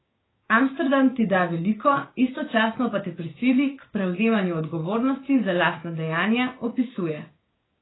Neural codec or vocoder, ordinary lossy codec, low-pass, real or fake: codec, 16 kHz, 6 kbps, DAC; AAC, 16 kbps; 7.2 kHz; fake